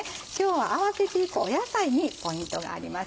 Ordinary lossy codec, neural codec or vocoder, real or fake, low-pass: none; none; real; none